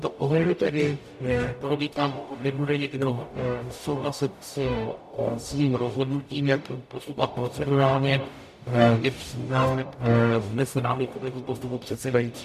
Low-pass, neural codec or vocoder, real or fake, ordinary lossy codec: 14.4 kHz; codec, 44.1 kHz, 0.9 kbps, DAC; fake; MP3, 96 kbps